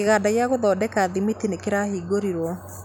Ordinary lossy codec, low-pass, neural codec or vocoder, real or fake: none; none; none; real